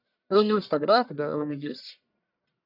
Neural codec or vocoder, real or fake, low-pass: codec, 44.1 kHz, 1.7 kbps, Pupu-Codec; fake; 5.4 kHz